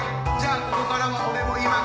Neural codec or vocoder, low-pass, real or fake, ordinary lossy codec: none; none; real; none